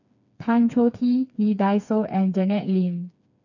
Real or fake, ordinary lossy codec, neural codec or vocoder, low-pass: fake; none; codec, 16 kHz, 4 kbps, FreqCodec, smaller model; 7.2 kHz